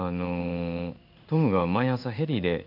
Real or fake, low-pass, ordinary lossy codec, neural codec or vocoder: fake; 5.4 kHz; none; codec, 16 kHz in and 24 kHz out, 1 kbps, XY-Tokenizer